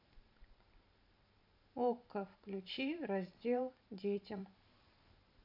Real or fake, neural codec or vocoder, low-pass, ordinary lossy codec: fake; vocoder, 44.1 kHz, 128 mel bands every 512 samples, BigVGAN v2; 5.4 kHz; none